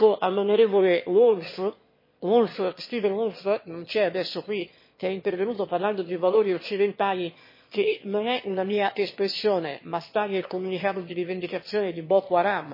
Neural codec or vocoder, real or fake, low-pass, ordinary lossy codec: autoencoder, 22.05 kHz, a latent of 192 numbers a frame, VITS, trained on one speaker; fake; 5.4 kHz; MP3, 24 kbps